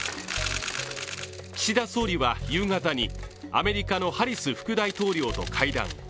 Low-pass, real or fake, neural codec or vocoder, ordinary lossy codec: none; real; none; none